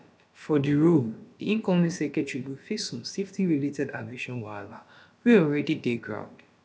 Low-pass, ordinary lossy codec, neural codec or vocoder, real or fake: none; none; codec, 16 kHz, about 1 kbps, DyCAST, with the encoder's durations; fake